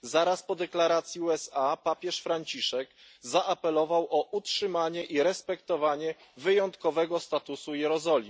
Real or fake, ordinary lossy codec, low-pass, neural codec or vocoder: real; none; none; none